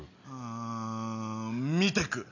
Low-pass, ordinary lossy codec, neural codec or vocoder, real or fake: 7.2 kHz; none; none; real